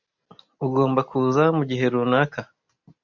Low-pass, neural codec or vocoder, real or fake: 7.2 kHz; none; real